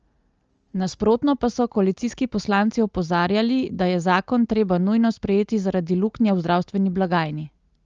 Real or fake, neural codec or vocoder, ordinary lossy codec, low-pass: real; none; Opus, 24 kbps; 7.2 kHz